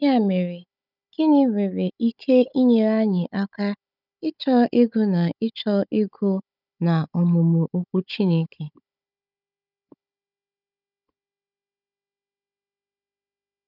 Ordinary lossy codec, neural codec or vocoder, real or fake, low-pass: none; codec, 16 kHz, 16 kbps, FunCodec, trained on Chinese and English, 50 frames a second; fake; 5.4 kHz